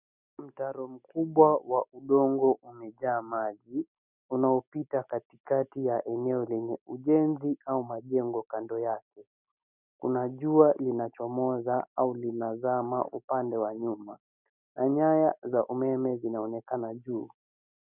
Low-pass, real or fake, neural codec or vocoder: 3.6 kHz; real; none